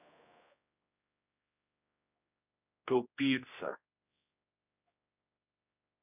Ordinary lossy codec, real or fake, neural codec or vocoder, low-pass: none; fake; codec, 16 kHz, 1 kbps, X-Codec, HuBERT features, trained on general audio; 3.6 kHz